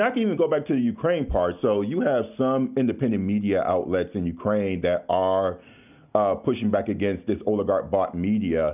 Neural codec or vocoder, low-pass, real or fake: none; 3.6 kHz; real